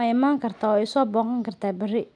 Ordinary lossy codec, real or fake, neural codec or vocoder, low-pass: none; real; none; 9.9 kHz